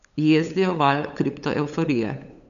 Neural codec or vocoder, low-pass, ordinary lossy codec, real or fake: codec, 16 kHz, 8 kbps, FunCodec, trained on LibriTTS, 25 frames a second; 7.2 kHz; none; fake